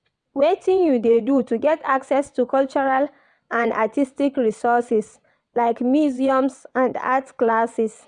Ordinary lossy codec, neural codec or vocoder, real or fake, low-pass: MP3, 96 kbps; vocoder, 22.05 kHz, 80 mel bands, WaveNeXt; fake; 9.9 kHz